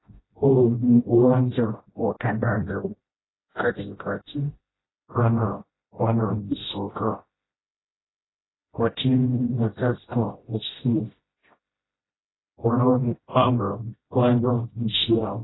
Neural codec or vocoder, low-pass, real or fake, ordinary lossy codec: codec, 16 kHz, 0.5 kbps, FreqCodec, smaller model; 7.2 kHz; fake; AAC, 16 kbps